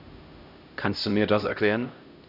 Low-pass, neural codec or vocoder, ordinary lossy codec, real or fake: 5.4 kHz; codec, 16 kHz, 0.5 kbps, X-Codec, HuBERT features, trained on LibriSpeech; none; fake